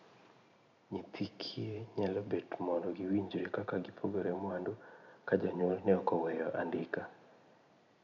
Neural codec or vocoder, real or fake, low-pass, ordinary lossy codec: vocoder, 44.1 kHz, 128 mel bands every 512 samples, BigVGAN v2; fake; 7.2 kHz; none